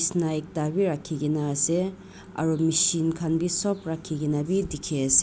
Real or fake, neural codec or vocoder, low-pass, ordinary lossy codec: real; none; none; none